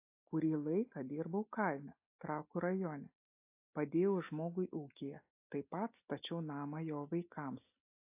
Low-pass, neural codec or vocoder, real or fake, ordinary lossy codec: 3.6 kHz; none; real; AAC, 32 kbps